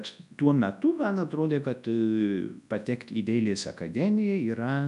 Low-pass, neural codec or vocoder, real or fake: 10.8 kHz; codec, 24 kHz, 0.9 kbps, WavTokenizer, large speech release; fake